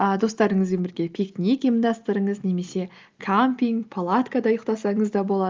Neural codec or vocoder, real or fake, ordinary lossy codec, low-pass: none; real; Opus, 32 kbps; 7.2 kHz